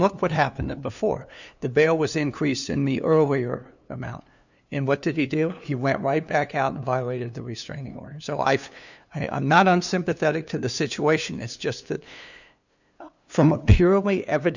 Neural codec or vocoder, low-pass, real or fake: codec, 16 kHz, 2 kbps, FunCodec, trained on LibriTTS, 25 frames a second; 7.2 kHz; fake